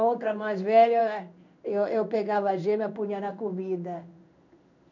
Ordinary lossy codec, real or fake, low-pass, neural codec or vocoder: none; fake; 7.2 kHz; codec, 16 kHz in and 24 kHz out, 1 kbps, XY-Tokenizer